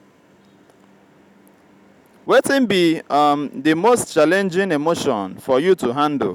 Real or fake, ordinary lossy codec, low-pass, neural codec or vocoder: real; none; 19.8 kHz; none